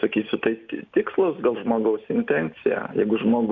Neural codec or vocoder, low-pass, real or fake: none; 7.2 kHz; real